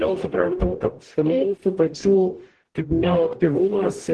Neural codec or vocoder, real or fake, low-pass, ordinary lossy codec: codec, 44.1 kHz, 0.9 kbps, DAC; fake; 10.8 kHz; Opus, 16 kbps